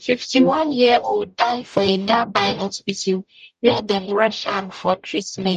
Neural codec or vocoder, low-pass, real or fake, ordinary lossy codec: codec, 44.1 kHz, 0.9 kbps, DAC; 14.4 kHz; fake; none